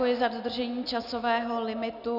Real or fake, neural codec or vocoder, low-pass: real; none; 5.4 kHz